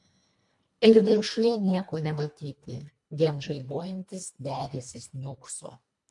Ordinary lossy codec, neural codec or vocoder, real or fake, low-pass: MP3, 64 kbps; codec, 24 kHz, 1.5 kbps, HILCodec; fake; 10.8 kHz